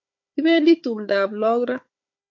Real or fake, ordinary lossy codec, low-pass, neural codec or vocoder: fake; MP3, 48 kbps; 7.2 kHz; codec, 16 kHz, 4 kbps, FunCodec, trained on Chinese and English, 50 frames a second